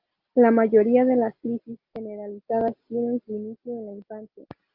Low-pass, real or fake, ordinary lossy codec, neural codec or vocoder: 5.4 kHz; real; Opus, 24 kbps; none